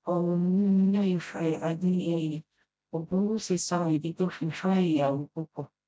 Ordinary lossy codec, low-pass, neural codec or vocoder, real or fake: none; none; codec, 16 kHz, 0.5 kbps, FreqCodec, smaller model; fake